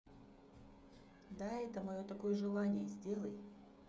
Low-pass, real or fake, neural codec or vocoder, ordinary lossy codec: none; fake; codec, 16 kHz, 16 kbps, FreqCodec, smaller model; none